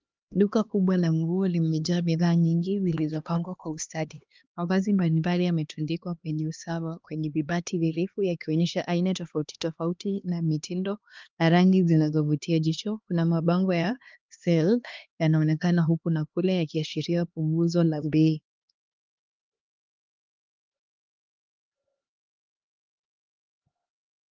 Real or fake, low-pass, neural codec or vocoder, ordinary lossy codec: fake; 7.2 kHz; codec, 16 kHz, 2 kbps, X-Codec, HuBERT features, trained on LibriSpeech; Opus, 32 kbps